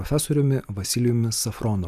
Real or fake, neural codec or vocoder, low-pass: real; none; 14.4 kHz